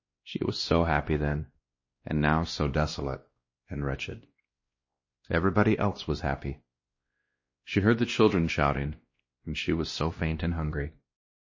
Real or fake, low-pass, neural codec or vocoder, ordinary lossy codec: fake; 7.2 kHz; codec, 16 kHz, 1 kbps, X-Codec, WavLM features, trained on Multilingual LibriSpeech; MP3, 32 kbps